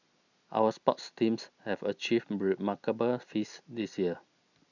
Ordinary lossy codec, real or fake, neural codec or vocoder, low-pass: none; real; none; 7.2 kHz